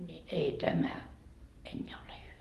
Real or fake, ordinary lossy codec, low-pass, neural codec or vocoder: real; Opus, 24 kbps; 14.4 kHz; none